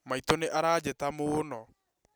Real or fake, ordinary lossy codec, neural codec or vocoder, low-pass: real; none; none; none